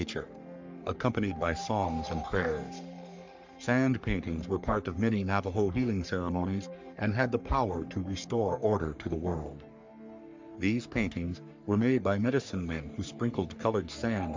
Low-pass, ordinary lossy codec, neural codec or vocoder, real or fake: 7.2 kHz; MP3, 64 kbps; codec, 44.1 kHz, 3.4 kbps, Pupu-Codec; fake